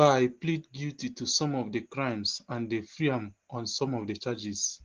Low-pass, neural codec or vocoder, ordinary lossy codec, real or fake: 7.2 kHz; none; Opus, 16 kbps; real